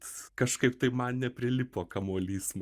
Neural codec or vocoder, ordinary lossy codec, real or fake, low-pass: none; Opus, 32 kbps; real; 14.4 kHz